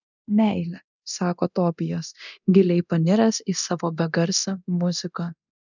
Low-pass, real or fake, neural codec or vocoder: 7.2 kHz; fake; codec, 24 kHz, 0.9 kbps, DualCodec